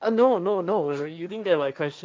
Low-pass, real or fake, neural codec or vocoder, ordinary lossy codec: none; fake; codec, 16 kHz, 1.1 kbps, Voila-Tokenizer; none